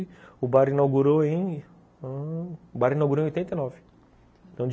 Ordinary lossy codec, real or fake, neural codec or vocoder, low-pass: none; real; none; none